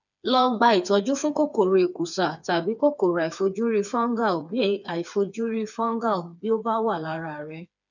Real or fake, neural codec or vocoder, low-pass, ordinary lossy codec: fake; codec, 16 kHz, 4 kbps, FreqCodec, smaller model; 7.2 kHz; none